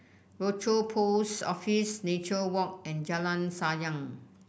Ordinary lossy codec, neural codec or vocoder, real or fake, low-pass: none; none; real; none